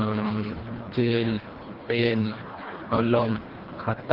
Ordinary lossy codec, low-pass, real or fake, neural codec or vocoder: Opus, 16 kbps; 5.4 kHz; fake; codec, 24 kHz, 1.5 kbps, HILCodec